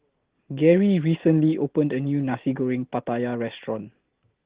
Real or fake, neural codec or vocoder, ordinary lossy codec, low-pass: real; none; Opus, 16 kbps; 3.6 kHz